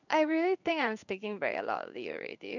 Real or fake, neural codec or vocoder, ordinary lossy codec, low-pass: fake; codec, 16 kHz in and 24 kHz out, 1 kbps, XY-Tokenizer; none; 7.2 kHz